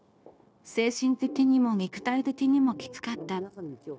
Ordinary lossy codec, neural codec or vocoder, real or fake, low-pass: none; codec, 16 kHz, 0.9 kbps, LongCat-Audio-Codec; fake; none